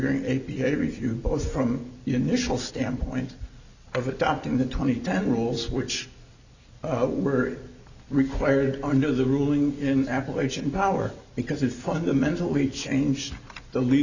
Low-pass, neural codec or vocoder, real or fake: 7.2 kHz; none; real